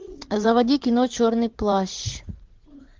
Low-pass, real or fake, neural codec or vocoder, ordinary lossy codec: 7.2 kHz; fake; vocoder, 44.1 kHz, 80 mel bands, Vocos; Opus, 16 kbps